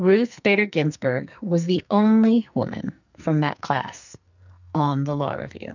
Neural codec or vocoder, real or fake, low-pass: codec, 44.1 kHz, 2.6 kbps, SNAC; fake; 7.2 kHz